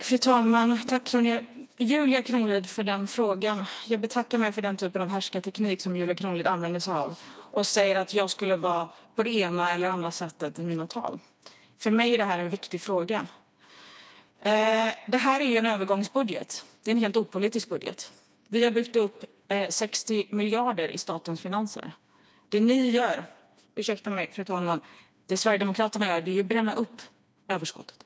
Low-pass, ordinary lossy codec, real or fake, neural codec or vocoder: none; none; fake; codec, 16 kHz, 2 kbps, FreqCodec, smaller model